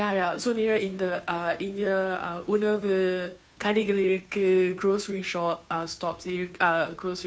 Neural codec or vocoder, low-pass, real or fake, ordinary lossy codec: codec, 16 kHz, 2 kbps, FunCodec, trained on Chinese and English, 25 frames a second; none; fake; none